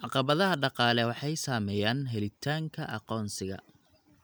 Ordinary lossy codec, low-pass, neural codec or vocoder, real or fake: none; none; none; real